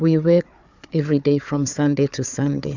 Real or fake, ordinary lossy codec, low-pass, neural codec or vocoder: fake; Opus, 64 kbps; 7.2 kHz; codec, 16 kHz, 4 kbps, FunCodec, trained on Chinese and English, 50 frames a second